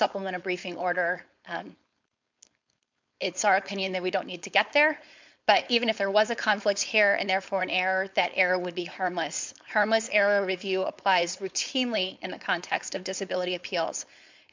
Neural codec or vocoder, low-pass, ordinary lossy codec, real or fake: codec, 16 kHz, 4.8 kbps, FACodec; 7.2 kHz; MP3, 64 kbps; fake